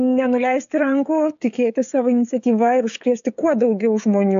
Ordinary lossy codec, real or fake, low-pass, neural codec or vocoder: AAC, 64 kbps; fake; 7.2 kHz; codec, 16 kHz, 16 kbps, FreqCodec, smaller model